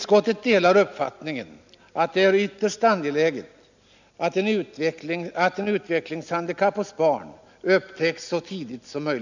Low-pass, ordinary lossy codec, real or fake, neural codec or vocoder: 7.2 kHz; none; real; none